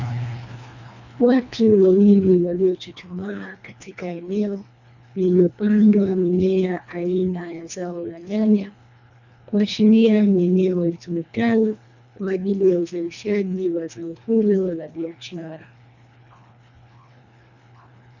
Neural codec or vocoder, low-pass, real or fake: codec, 24 kHz, 1.5 kbps, HILCodec; 7.2 kHz; fake